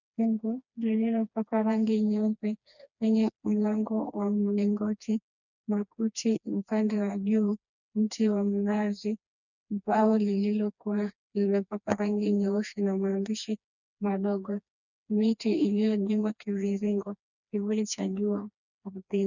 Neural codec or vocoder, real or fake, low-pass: codec, 16 kHz, 2 kbps, FreqCodec, smaller model; fake; 7.2 kHz